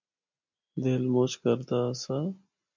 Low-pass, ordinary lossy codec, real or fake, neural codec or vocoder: 7.2 kHz; MP3, 64 kbps; real; none